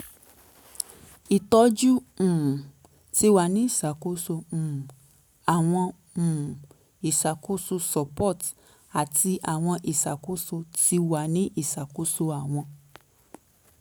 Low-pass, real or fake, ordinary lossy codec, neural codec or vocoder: none; real; none; none